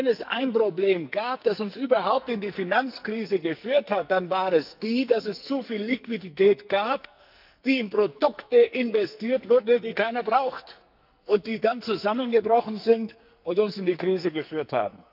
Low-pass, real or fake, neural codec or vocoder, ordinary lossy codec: 5.4 kHz; fake; codec, 44.1 kHz, 2.6 kbps, SNAC; none